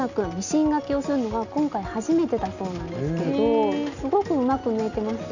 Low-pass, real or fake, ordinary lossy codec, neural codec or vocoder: 7.2 kHz; real; none; none